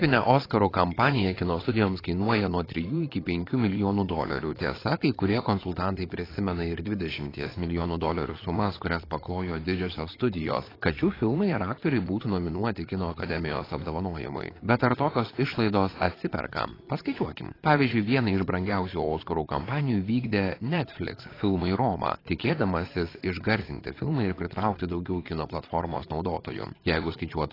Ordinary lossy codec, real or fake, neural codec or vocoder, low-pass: AAC, 24 kbps; fake; vocoder, 22.05 kHz, 80 mel bands, Vocos; 5.4 kHz